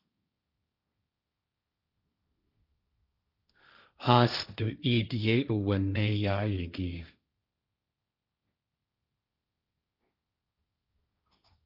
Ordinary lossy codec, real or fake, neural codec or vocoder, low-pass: Opus, 64 kbps; fake; codec, 16 kHz, 1.1 kbps, Voila-Tokenizer; 5.4 kHz